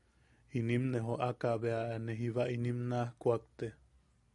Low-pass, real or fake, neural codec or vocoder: 10.8 kHz; real; none